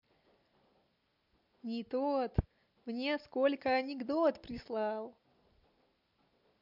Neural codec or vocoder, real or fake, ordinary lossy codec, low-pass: none; real; MP3, 48 kbps; 5.4 kHz